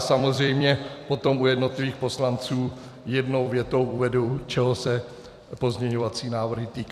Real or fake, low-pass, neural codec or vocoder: fake; 14.4 kHz; vocoder, 44.1 kHz, 128 mel bands every 256 samples, BigVGAN v2